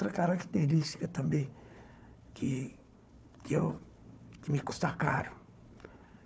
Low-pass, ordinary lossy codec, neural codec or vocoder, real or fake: none; none; codec, 16 kHz, 4 kbps, FunCodec, trained on Chinese and English, 50 frames a second; fake